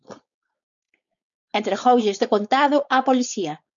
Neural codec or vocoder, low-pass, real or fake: codec, 16 kHz, 4.8 kbps, FACodec; 7.2 kHz; fake